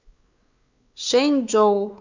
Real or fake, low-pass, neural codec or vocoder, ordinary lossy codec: fake; 7.2 kHz; codec, 16 kHz, 4 kbps, X-Codec, WavLM features, trained on Multilingual LibriSpeech; Opus, 64 kbps